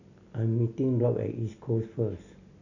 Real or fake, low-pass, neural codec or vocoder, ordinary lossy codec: real; 7.2 kHz; none; none